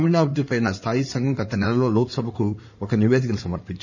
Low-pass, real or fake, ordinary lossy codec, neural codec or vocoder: 7.2 kHz; fake; none; codec, 16 kHz in and 24 kHz out, 2.2 kbps, FireRedTTS-2 codec